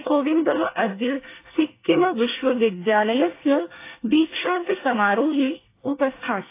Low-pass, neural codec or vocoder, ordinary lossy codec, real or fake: 3.6 kHz; codec, 24 kHz, 1 kbps, SNAC; AAC, 24 kbps; fake